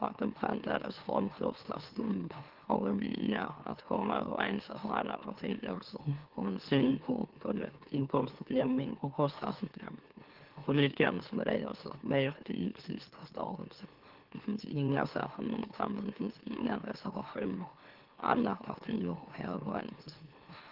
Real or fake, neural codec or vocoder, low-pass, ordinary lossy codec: fake; autoencoder, 44.1 kHz, a latent of 192 numbers a frame, MeloTTS; 5.4 kHz; Opus, 16 kbps